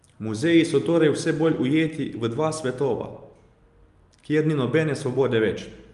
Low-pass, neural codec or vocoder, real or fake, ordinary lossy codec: 10.8 kHz; none; real; Opus, 32 kbps